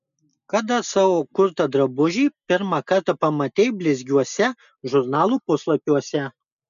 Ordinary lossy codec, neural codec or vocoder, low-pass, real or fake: MP3, 96 kbps; none; 7.2 kHz; real